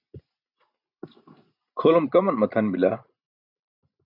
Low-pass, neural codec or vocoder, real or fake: 5.4 kHz; none; real